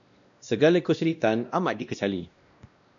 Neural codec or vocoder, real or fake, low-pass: codec, 16 kHz, 1 kbps, X-Codec, WavLM features, trained on Multilingual LibriSpeech; fake; 7.2 kHz